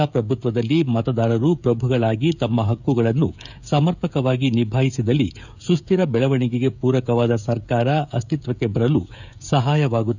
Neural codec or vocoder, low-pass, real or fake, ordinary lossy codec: codec, 16 kHz, 16 kbps, FreqCodec, smaller model; 7.2 kHz; fake; none